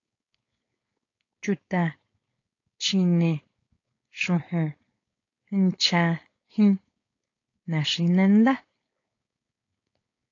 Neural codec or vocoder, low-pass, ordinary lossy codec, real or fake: codec, 16 kHz, 4.8 kbps, FACodec; 7.2 kHz; AAC, 32 kbps; fake